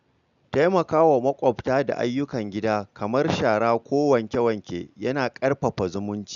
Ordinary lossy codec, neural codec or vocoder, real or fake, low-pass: none; none; real; 7.2 kHz